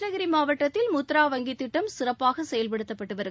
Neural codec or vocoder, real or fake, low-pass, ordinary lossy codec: none; real; none; none